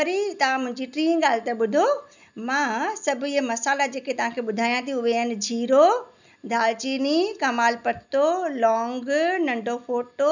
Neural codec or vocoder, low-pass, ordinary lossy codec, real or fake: none; 7.2 kHz; none; real